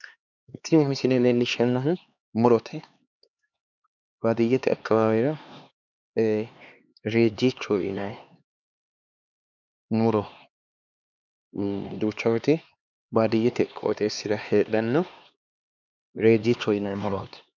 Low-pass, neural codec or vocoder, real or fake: 7.2 kHz; codec, 16 kHz, 2 kbps, X-Codec, HuBERT features, trained on LibriSpeech; fake